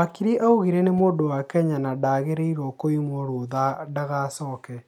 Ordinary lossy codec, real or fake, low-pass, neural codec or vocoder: none; real; 19.8 kHz; none